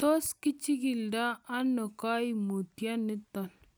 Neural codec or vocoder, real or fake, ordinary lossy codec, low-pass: none; real; none; none